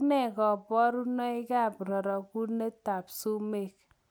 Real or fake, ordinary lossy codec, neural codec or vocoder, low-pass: real; none; none; none